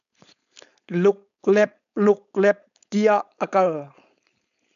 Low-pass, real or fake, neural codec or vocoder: 7.2 kHz; fake; codec, 16 kHz, 4.8 kbps, FACodec